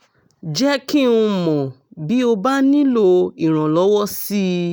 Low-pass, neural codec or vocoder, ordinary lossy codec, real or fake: none; none; none; real